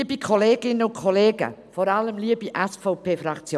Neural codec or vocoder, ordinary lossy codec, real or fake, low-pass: none; none; real; none